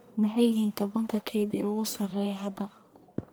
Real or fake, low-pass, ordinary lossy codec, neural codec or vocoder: fake; none; none; codec, 44.1 kHz, 1.7 kbps, Pupu-Codec